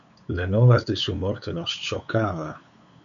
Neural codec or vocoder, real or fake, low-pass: codec, 16 kHz, 8 kbps, FunCodec, trained on LibriTTS, 25 frames a second; fake; 7.2 kHz